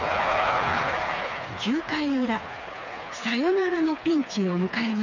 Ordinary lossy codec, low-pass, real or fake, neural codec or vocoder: none; 7.2 kHz; fake; codec, 16 kHz, 4 kbps, FreqCodec, smaller model